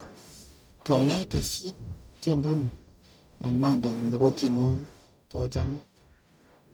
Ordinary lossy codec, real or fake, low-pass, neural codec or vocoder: none; fake; none; codec, 44.1 kHz, 0.9 kbps, DAC